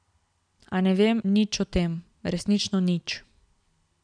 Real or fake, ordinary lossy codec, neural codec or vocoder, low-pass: real; none; none; 9.9 kHz